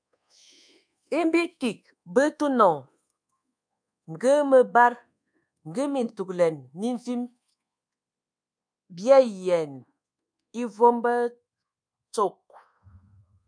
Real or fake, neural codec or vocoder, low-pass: fake; codec, 24 kHz, 1.2 kbps, DualCodec; 9.9 kHz